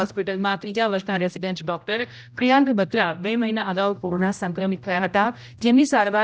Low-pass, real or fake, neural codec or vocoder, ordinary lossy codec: none; fake; codec, 16 kHz, 0.5 kbps, X-Codec, HuBERT features, trained on general audio; none